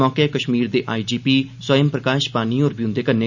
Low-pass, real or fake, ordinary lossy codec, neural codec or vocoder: 7.2 kHz; real; none; none